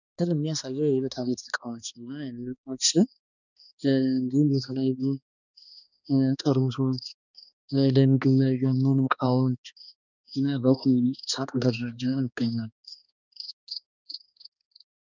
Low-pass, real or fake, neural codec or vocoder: 7.2 kHz; fake; codec, 16 kHz, 2 kbps, X-Codec, HuBERT features, trained on balanced general audio